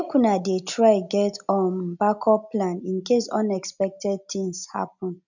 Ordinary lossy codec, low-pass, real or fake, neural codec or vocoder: none; 7.2 kHz; real; none